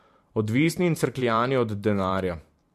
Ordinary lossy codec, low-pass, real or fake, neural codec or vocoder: MP3, 64 kbps; 14.4 kHz; fake; vocoder, 44.1 kHz, 128 mel bands every 512 samples, BigVGAN v2